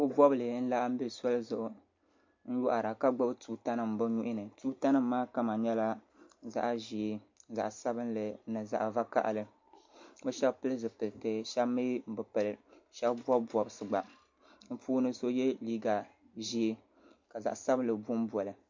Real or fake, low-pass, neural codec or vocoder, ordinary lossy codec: real; 7.2 kHz; none; MP3, 48 kbps